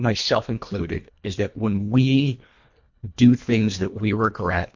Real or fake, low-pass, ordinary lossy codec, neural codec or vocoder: fake; 7.2 kHz; MP3, 48 kbps; codec, 24 kHz, 1.5 kbps, HILCodec